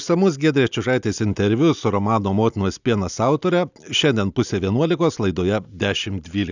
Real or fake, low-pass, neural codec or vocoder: real; 7.2 kHz; none